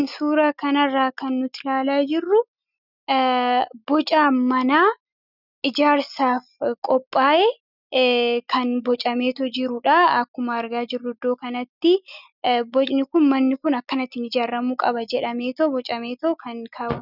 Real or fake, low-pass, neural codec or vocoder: real; 5.4 kHz; none